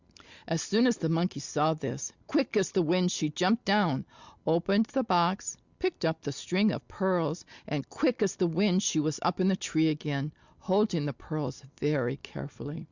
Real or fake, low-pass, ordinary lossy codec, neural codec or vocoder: real; 7.2 kHz; Opus, 64 kbps; none